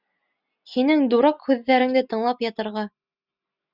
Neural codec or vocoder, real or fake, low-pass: none; real; 5.4 kHz